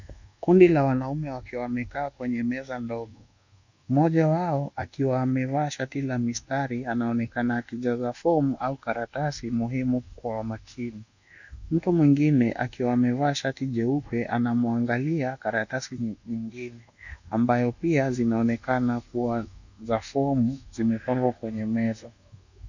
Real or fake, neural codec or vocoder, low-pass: fake; codec, 24 kHz, 1.2 kbps, DualCodec; 7.2 kHz